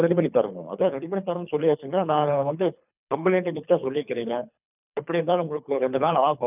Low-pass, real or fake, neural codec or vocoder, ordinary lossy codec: 3.6 kHz; fake; codec, 24 kHz, 3 kbps, HILCodec; none